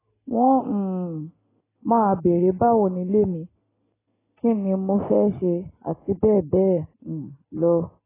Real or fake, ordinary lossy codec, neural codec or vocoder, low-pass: real; AAC, 16 kbps; none; 3.6 kHz